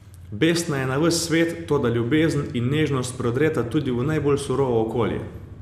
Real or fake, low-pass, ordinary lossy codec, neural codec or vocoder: real; 14.4 kHz; none; none